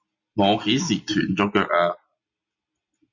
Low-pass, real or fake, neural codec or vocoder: 7.2 kHz; real; none